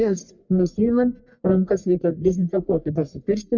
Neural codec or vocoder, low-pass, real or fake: codec, 44.1 kHz, 1.7 kbps, Pupu-Codec; 7.2 kHz; fake